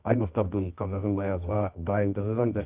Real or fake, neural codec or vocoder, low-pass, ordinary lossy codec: fake; codec, 24 kHz, 0.9 kbps, WavTokenizer, medium music audio release; 3.6 kHz; Opus, 32 kbps